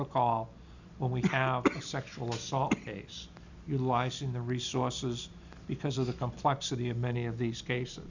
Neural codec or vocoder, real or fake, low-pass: none; real; 7.2 kHz